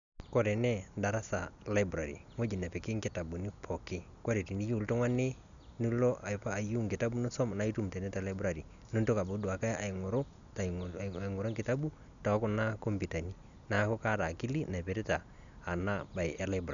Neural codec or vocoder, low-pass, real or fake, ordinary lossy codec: none; 7.2 kHz; real; none